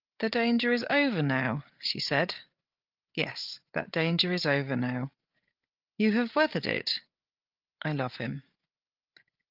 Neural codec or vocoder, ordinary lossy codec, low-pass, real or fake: codec, 16 kHz, 8 kbps, FreqCodec, larger model; Opus, 24 kbps; 5.4 kHz; fake